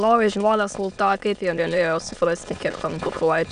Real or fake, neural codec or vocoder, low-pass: fake; autoencoder, 22.05 kHz, a latent of 192 numbers a frame, VITS, trained on many speakers; 9.9 kHz